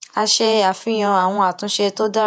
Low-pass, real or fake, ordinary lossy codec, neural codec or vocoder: 14.4 kHz; fake; none; vocoder, 48 kHz, 128 mel bands, Vocos